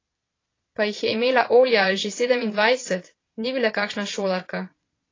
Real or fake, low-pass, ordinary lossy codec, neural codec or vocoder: fake; 7.2 kHz; AAC, 32 kbps; vocoder, 22.05 kHz, 80 mel bands, Vocos